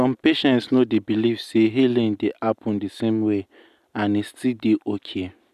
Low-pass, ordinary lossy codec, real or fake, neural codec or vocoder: 14.4 kHz; none; real; none